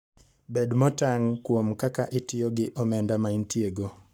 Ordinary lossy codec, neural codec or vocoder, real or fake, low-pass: none; codec, 44.1 kHz, 7.8 kbps, Pupu-Codec; fake; none